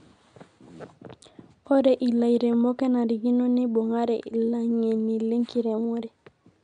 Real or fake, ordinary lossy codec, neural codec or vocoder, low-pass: real; none; none; 9.9 kHz